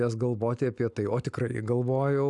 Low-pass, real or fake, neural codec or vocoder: 10.8 kHz; real; none